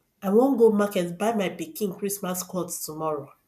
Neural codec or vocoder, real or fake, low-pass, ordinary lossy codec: none; real; 14.4 kHz; none